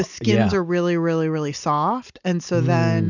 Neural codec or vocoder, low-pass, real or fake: none; 7.2 kHz; real